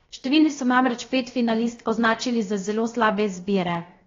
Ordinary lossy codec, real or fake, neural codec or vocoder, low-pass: AAC, 32 kbps; fake; codec, 16 kHz, 0.7 kbps, FocalCodec; 7.2 kHz